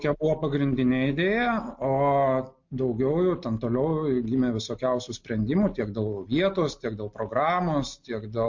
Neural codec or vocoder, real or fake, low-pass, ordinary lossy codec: none; real; 7.2 kHz; MP3, 48 kbps